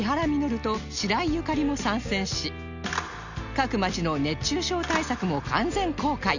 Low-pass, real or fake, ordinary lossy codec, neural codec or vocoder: 7.2 kHz; real; none; none